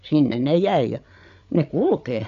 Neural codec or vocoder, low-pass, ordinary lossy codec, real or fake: codec, 16 kHz, 8 kbps, FreqCodec, larger model; 7.2 kHz; MP3, 64 kbps; fake